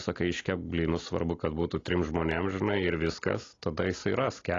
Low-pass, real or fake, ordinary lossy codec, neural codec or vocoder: 7.2 kHz; real; AAC, 32 kbps; none